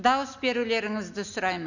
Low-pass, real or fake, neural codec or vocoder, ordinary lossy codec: 7.2 kHz; real; none; none